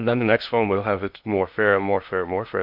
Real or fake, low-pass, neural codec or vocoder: fake; 5.4 kHz; codec, 16 kHz in and 24 kHz out, 0.6 kbps, FocalCodec, streaming, 2048 codes